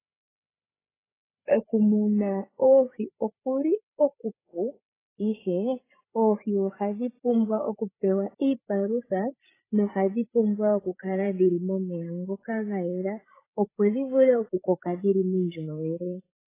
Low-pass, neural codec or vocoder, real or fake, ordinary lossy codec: 3.6 kHz; codec, 16 kHz, 8 kbps, FreqCodec, larger model; fake; AAC, 16 kbps